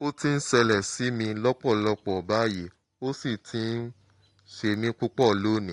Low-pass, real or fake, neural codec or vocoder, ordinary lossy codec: 10.8 kHz; real; none; AAC, 48 kbps